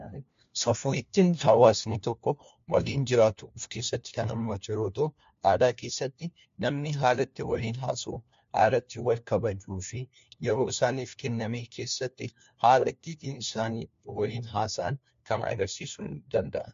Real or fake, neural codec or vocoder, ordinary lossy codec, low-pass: fake; codec, 16 kHz, 1 kbps, FunCodec, trained on LibriTTS, 50 frames a second; MP3, 48 kbps; 7.2 kHz